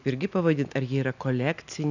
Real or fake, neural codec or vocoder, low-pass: real; none; 7.2 kHz